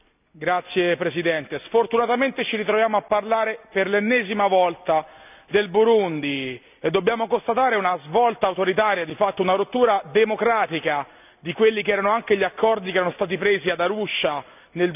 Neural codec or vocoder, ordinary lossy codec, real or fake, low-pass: none; none; real; 3.6 kHz